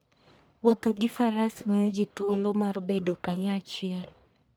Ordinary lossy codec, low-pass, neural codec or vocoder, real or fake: none; none; codec, 44.1 kHz, 1.7 kbps, Pupu-Codec; fake